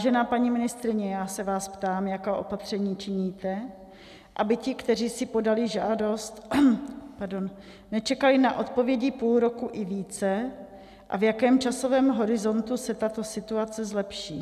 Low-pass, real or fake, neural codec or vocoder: 14.4 kHz; real; none